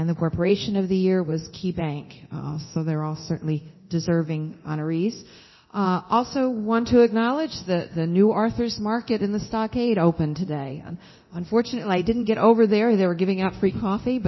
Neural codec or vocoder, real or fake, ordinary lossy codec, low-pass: codec, 24 kHz, 0.9 kbps, DualCodec; fake; MP3, 24 kbps; 7.2 kHz